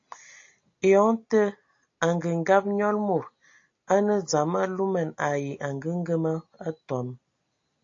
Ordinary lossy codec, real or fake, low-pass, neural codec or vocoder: AAC, 48 kbps; real; 7.2 kHz; none